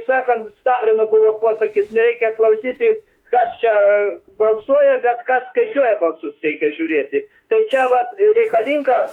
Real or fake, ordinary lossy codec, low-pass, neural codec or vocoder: fake; MP3, 96 kbps; 19.8 kHz; autoencoder, 48 kHz, 32 numbers a frame, DAC-VAE, trained on Japanese speech